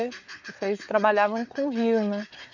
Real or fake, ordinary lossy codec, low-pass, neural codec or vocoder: fake; none; 7.2 kHz; codec, 16 kHz, 4 kbps, FreqCodec, larger model